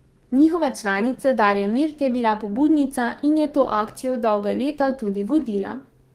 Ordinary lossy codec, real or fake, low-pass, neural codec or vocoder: Opus, 24 kbps; fake; 14.4 kHz; codec, 32 kHz, 1.9 kbps, SNAC